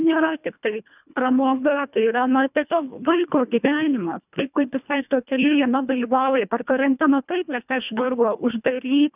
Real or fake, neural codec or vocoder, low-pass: fake; codec, 24 kHz, 1.5 kbps, HILCodec; 3.6 kHz